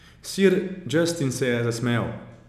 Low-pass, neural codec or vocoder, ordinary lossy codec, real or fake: 14.4 kHz; none; none; real